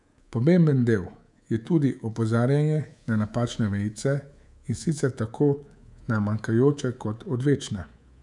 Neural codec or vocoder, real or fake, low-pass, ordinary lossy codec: codec, 24 kHz, 3.1 kbps, DualCodec; fake; 10.8 kHz; none